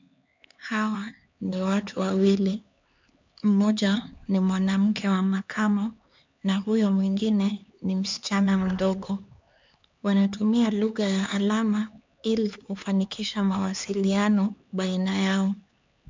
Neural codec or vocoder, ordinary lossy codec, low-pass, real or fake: codec, 16 kHz, 4 kbps, X-Codec, HuBERT features, trained on LibriSpeech; MP3, 64 kbps; 7.2 kHz; fake